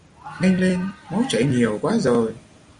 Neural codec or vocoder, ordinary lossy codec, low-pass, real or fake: none; Opus, 64 kbps; 9.9 kHz; real